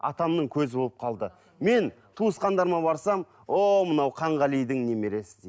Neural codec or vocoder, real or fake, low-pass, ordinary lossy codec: none; real; none; none